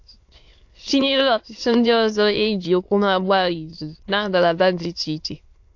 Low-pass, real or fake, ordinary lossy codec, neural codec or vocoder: 7.2 kHz; fake; AAC, 48 kbps; autoencoder, 22.05 kHz, a latent of 192 numbers a frame, VITS, trained on many speakers